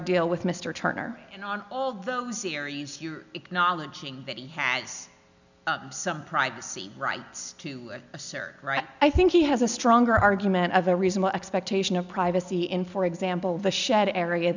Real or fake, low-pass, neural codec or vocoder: real; 7.2 kHz; none